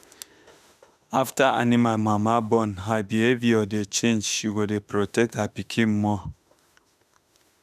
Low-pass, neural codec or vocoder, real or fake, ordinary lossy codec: 14.4 kHz; autoencoder, 48 kHz, 32 numbers a frame, DAC-VAE, trained on Japanese speech; fake; none